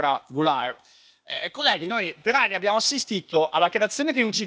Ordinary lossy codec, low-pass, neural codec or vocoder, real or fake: none; none; codec, 16 kHz, 0.8 kbps, ZipCodec; fake